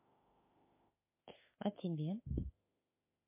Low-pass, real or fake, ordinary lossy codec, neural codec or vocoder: 3.6 kHz; fake; MP3, 24 kbps; autoencoder, 48 kHz, 32 numbers a frame, DAC-VAE, trained on Japanese speech